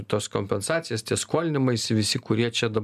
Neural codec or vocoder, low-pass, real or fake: none; 14.4 kHz; real